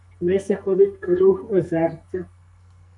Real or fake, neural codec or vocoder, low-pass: fake; codec, 44.1 kHz, 2.6 kbps, SNAC; 10.8 kHz